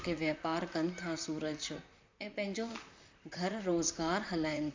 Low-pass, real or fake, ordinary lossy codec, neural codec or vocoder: 7.2 kHz; fake; MP3, 64 kbps; vocoder, 44.1 kHz, 128 mel bands, Pupu-Vocoder